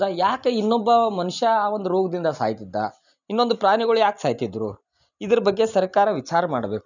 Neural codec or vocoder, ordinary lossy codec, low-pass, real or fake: none; none; 7.2 kHz; real